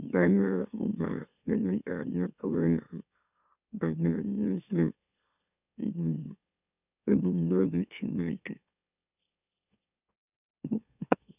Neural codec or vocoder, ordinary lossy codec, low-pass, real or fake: autoencoder, 44.1 kHz, a latent of 192 numbers a frame, MeloTTS; none; 3.6 kHz; fake